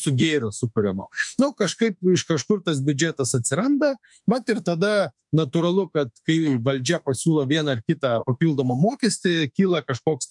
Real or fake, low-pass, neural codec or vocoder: fake; 10.8 kHz; autoencoder, 48 kHz, 32 numbers a frame, DAC-VAE, trained on Japanese speech